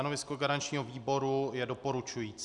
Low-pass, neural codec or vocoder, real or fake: 10.8 kHz; none; real